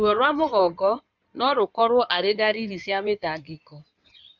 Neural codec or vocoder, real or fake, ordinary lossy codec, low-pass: codec, 16 kHz in and 24 kHz out, 2.2 kbps, FireRedTTS-2 codec; fake; none; 7.2 kHz